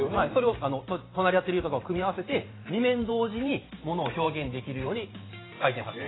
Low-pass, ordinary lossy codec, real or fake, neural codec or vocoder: 7.2 kHz; AAC, 16 kbps; fake; vocoder, 44.1 kHz, 128 mel bands every 512 samples, BigVGAN v2